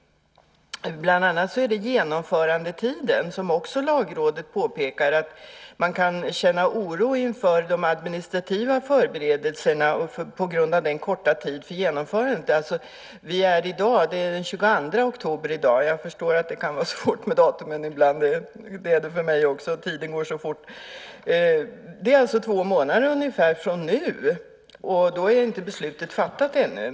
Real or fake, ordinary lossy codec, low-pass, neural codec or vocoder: real; none; none; none